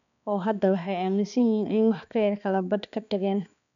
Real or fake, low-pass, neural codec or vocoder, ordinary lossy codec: fake; 7.2 kHz; codec, 16 kHz, 2 kbps, X-Codec, HuBERT features, trained on balanced general audio; none